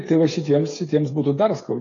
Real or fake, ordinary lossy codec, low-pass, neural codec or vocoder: fake; AAC, 32 kbps; 7.2 kHz; codec, 16 kHz, 8 kbps, FreqCodec, smaller model